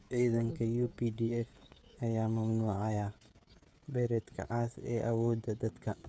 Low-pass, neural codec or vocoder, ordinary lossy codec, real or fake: none; codec, 16 kHz, 16 kbps, FreqCodec, smaller model; none; fake